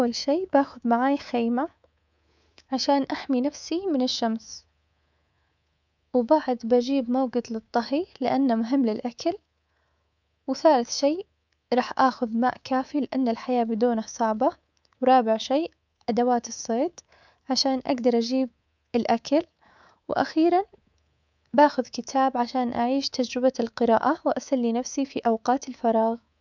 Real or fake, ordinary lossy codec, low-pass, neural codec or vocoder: fake; none; 7.2 kHz; codec, 24 kHz, 3.1 kbps, DualCodec